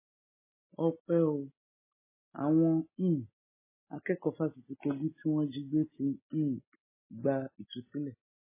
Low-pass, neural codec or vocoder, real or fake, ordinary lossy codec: 3.6 kHz; none; real; MP3, 16 kbps